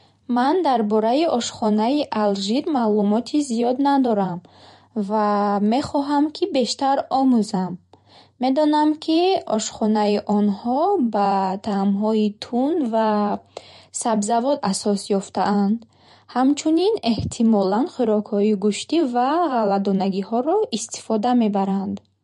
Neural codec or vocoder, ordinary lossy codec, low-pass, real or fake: vocoder, 44.1 kHz, 128 mel bands every 512 samples, BigVGAN v2; MP3, 48 kbps; 14.4 kHz; fake